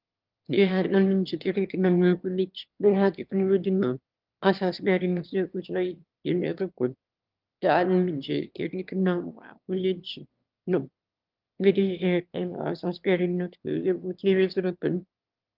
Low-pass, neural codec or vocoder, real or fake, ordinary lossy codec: 5.4 kHz; autoencoder, 22.05 kHz, a latent of 192 numbers a frame, VITS, trained on one speaker; fake; Opus, 32 kbps